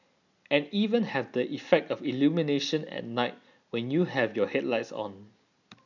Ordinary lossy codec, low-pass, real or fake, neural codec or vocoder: none; 7.2 kHz; real; none